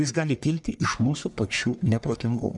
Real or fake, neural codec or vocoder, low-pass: fake; codec, 44.1 kHz, 1.7 kbps, Pupu-Codec; 10.8 kHz